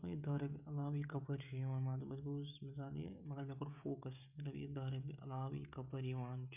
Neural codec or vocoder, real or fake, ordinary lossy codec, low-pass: codec, 16 kHz, 16 kbps, FreqCodec, smaller model; fake; none; 3.6 kHz